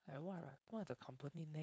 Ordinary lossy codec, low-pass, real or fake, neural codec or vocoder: none; none; fake; codec, 16 kHz, 4.8 kbps, FACodec